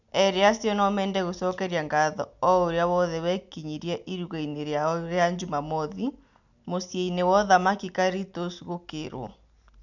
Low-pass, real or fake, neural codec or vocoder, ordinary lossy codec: 7.2 kHz; real; none; none